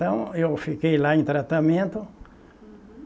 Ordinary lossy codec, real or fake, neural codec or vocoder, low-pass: none; real; none; none